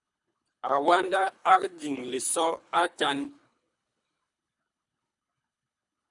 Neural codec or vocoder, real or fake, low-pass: codec, 24 kHz, 3 kbps, HILCodec; fake; 10.8 kHz